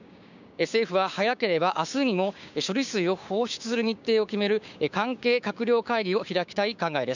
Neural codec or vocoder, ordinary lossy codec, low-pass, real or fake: codec, 16 kHz, 6 kbps, DAC; none; 7.2 kHz; fake